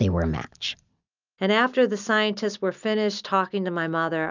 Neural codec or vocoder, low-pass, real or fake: none; 7.2 kHz; real